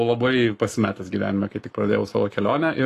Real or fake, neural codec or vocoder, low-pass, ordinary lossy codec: fake; codec, 44.1 kHz, 7.8 kbps, Pupu-Codec; 14.4 kHz; AAC, 48 kbps